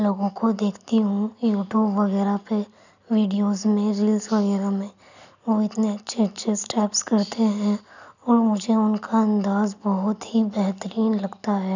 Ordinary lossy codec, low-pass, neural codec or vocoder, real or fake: none; 7.2 kHz; none; real